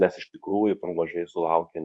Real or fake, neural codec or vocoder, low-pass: fake; codec, 24 kHz, 0.9 kbps, WavTokenizer, medium speech release version 2; 10.8 kHz